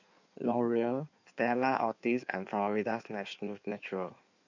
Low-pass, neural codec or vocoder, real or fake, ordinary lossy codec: 7.2 kHz; codec, 16 kHz in and 24 kHz out, 1.1 kbps, FireRedTTS-2 codec; fake; none